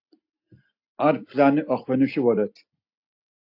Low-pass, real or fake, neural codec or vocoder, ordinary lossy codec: 5.4 kHz; real; none; AAC, 32 kbps